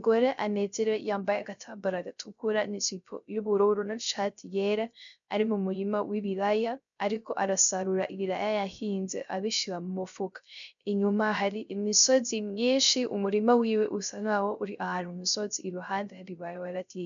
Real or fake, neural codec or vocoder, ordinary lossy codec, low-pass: fake; codec, 16 kHz, 0.3 kbps, FocalCodec; MP3, 96 kbps; 7.2 kHz